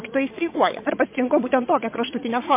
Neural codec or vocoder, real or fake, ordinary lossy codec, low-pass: vocoder, 22.05 kHz, 80 mel bands, WaveNeXt; fake; MP3, 24 kbps; 3.6 kHz